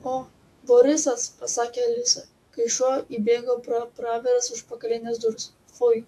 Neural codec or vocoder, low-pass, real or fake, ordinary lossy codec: autoencoder, 48 kHz, 128 numbers a frame, DAC-VAE, trained on Japanese speech; 14.4 kHz; fake; AAC, 64 kbps